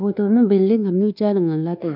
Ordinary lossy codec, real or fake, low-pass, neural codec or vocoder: none; fake; 5.4 kHz; codec, 24 kHz, 1.2 kbps, DualCodec